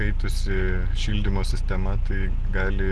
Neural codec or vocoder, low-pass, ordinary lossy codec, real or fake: none; 10.8 kHz; Opus, 16 kbps; real